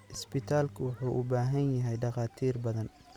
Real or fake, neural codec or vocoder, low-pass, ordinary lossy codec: real; none; 19.8 kHz; none